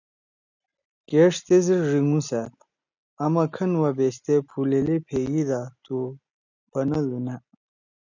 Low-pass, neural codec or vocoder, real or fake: 7.2 kHz; none; real